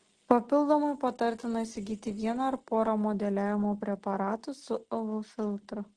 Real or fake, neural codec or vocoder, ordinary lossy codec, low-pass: real; none; Opus, 16 kbps; 9.9 kHz